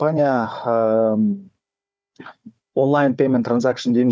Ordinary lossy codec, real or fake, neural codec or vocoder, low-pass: none; fake; codec, 16 kHz, 4 kbps, FunCodec, trained on Chinese and English, 50 frames a second; none